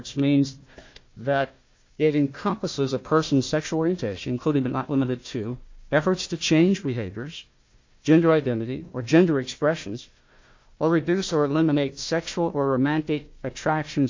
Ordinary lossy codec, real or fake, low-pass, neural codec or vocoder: MP3, 48 kbps; fake; 7.2 kHz; codec, 16 kHz, 1 kbps, FunCodec, trained on Chinese and English, 50 frames a second